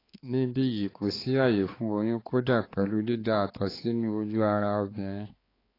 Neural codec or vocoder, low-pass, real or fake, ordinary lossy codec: codec, 16 kHz, 4 kbps, X-Codec, HuBERT features, trained on balanced general audio; 5.4 kHz; fake; AAC, 24 kbps